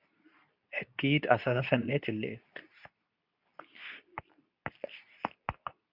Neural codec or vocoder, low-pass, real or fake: codec, 24 kHz, 0.9 kbps, WavTokenizer, medium speech release version 2; 5.4 kHz; fake